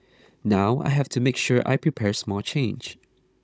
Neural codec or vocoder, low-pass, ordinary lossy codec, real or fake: codec, 16 kHz, 16 kbps, FunCodec, trained on Chinese and English, 50 frames a second; none; none; fake